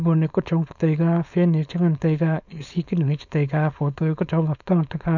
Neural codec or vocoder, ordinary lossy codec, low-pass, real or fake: codec, 16 kHz, 4.8 kbps, FACodec; none; 7.2 kHz; fake